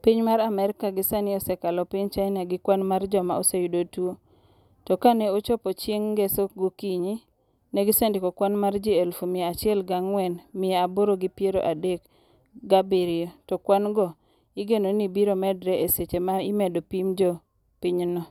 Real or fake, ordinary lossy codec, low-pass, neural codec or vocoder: real; none; 19.8 kHz; none